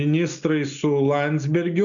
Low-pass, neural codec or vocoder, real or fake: 7.2 kHz; none; real